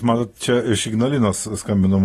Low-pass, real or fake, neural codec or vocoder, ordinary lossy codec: 19.8 kHz; real; none; AAC, 32 kbps